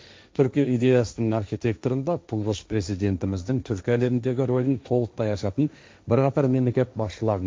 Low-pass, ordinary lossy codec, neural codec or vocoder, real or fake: none; none; codec, 16 kHz, 1.1 kbps, Voila-Tokenizer; fake